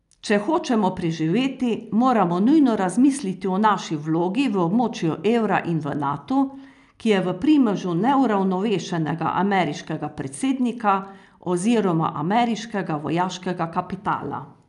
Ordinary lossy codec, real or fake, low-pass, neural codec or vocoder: none; real; 10.8 kHz; none